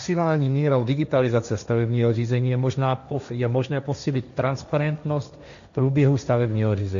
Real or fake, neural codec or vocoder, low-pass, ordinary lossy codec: fake; codec, 16 kHz, 1.1 kbps, Voila-Tokenizer; 7.2 kHz; AAC, 96 kbps